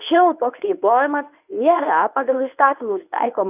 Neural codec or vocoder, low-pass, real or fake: codec, 24 kHz, 0.9 kbps, WavTokenizer, medium speech release version 1; 3.6 kHz; fake